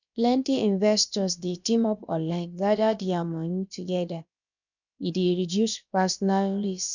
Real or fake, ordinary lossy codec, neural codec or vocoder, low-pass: fake; none; codec, 16 kHz, about 1 kbps, DyCAST, with the encoder's durations; 7.2 kHz